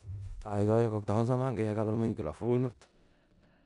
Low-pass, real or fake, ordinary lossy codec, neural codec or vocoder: 10.8 kHz; fake; none; codec, 16 kHz in and 24 kHz out, 0.4 kbps, LongCat-Audio-Codec, four codebook decoder